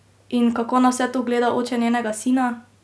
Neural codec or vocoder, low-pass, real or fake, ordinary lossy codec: none; none; real; none